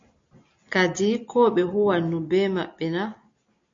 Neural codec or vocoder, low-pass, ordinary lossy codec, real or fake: none; 7.2 kHz; MP3, 48 kbps; real